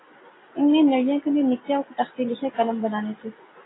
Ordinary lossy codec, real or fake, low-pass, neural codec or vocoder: AAC, 16 kbps; real; 7.2 kHz; none